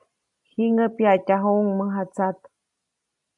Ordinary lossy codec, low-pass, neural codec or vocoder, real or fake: AAC, 64 kbps; 10.8 kHz; none; real